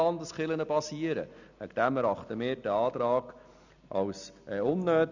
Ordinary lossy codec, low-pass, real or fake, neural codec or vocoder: none; 7.2 kHz; real; none